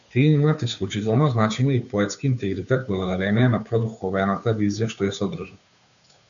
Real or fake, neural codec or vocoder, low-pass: fake; codec, 16 kHz, 2 kbps, FunCodec, trained on Chinese and English, 25 frames a second; 7.2 kHz